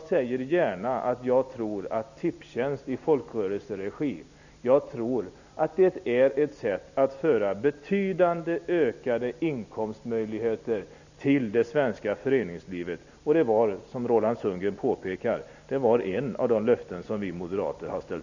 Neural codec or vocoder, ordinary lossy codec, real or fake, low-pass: none; none; real; 7.2 kHz